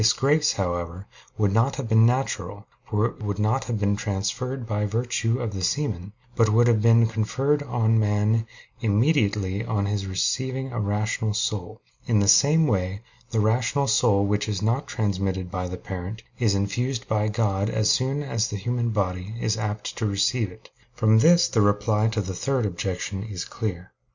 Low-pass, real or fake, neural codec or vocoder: 7.2 kHz; real; none